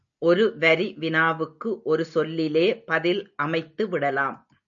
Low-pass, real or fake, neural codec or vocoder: 7.2 kHz; real; none